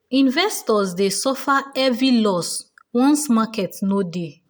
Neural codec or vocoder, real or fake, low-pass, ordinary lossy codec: none; real; none; none